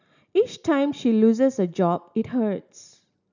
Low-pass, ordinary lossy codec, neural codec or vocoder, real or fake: 7.2 kHz; none; none; real